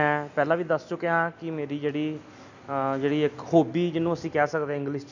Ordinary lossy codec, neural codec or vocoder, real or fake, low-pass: none; none; real; 7.2 kHz